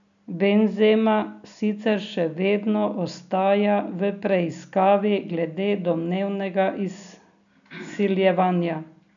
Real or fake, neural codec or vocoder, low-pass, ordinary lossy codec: real; none; 7.2 kHz; none